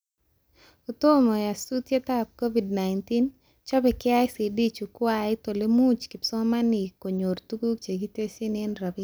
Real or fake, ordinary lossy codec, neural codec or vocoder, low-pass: real; none; none; none